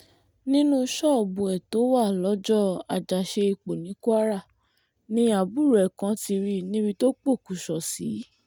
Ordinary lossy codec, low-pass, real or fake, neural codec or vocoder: none; none; real; none